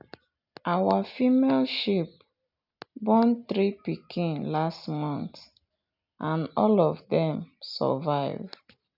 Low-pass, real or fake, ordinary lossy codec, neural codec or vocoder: 5.4 kHz; real; none; none